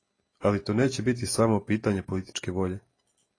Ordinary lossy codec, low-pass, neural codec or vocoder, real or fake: AAC, 32 kbps; 9.9 kHz; none; real